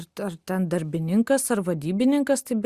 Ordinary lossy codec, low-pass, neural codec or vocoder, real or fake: Opus, 64 kbps; 14.4 kHz; none; real